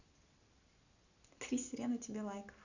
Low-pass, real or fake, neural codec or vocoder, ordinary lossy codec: 7.2 kHz; real; none; none